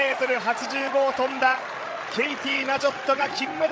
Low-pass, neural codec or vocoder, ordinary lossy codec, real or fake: none; codec, 16 kHz, 16 kbps, FreqCodec, larger model; none; fake